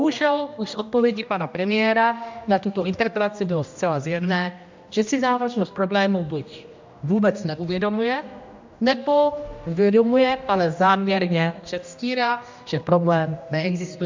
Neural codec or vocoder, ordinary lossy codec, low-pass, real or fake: codec, 16 kHz, 1 kbps, X-Codec, HuBERT features, trained on general audio; MP3, 64 kbps; 7.2 kHz; fake